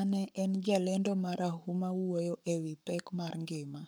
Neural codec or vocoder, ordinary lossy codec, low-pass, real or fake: codec, 44.1 kHz, 7.8 kbps, Pupu-Codec; none; none; fake